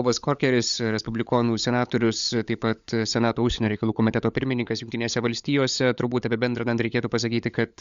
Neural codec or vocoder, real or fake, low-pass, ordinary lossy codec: codec, 16 kHz, 16 kbps, FunCodec, trained on LibriTTS, 50 frames a second; fake; 7.2 kHz; Opus, 64 kbps